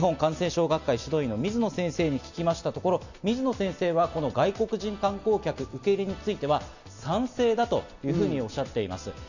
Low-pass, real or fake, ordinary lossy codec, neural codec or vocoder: 7.2 kHz; real; none; none